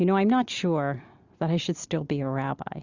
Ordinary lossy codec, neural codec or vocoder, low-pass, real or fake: Opus, 64 kbps; none; 7.2 kHz; real